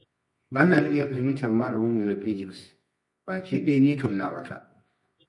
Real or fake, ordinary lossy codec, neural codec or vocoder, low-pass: fake; MP3, 48 kbps; codec, 24 kHz, 0.9 kbps, WavTokenizer, medium music audio release; 10.8 kHz